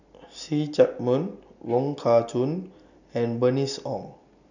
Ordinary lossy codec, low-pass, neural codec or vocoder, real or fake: none; 7.2 kHz; none; real